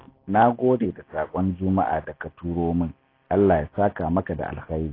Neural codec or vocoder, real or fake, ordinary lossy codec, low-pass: none; real; AAC, 24 kbps; 5.4 kHz